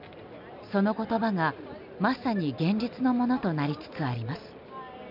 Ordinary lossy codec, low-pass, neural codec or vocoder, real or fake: none; 5.4 kHz; none; real